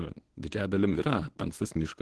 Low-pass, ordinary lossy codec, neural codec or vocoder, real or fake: 10.8 kHz; Opus, 16 kbps; codec, 24 kHz, 0.9 kbps, WavTokenizer, medium speech release version 1; fake